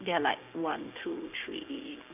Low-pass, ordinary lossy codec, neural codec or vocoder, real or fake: 3.6 kHz; none; codec, 16 kHz, 6 kbps, DAC; fake